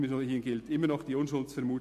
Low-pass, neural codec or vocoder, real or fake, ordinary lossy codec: 14.4 kHz; none; real; MP3, 64 kbps